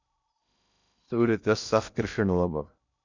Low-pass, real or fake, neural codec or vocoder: 7.2 kHz; fake; codec, 16 kHz in and 24 kHz out, 0.6 kbps, FocalCodec, streaming, 2048 codes